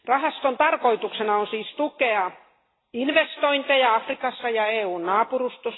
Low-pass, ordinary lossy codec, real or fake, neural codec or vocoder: 7.2 kHz; AAC, 16 kbps; real; none